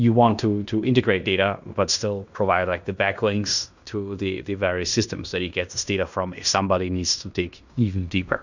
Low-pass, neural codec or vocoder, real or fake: 7.2 kHz; codec, 16 kHz in and 24 kHz out, 0.9 kbps, LongCat-Audio-Codec, fine tuned four codebook decoder; fake